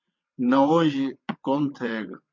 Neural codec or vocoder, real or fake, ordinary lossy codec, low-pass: vocoder, 22.05 kHz, 80 mel bands, WaveNeXt; fake; MP3, 48 kbps; 7.2 kHz